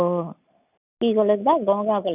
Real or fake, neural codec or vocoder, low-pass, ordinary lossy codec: real; none; 3.6 kHz; none